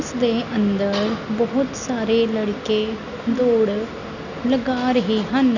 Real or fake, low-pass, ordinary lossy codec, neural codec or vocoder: real; 7.2 kHz; none; none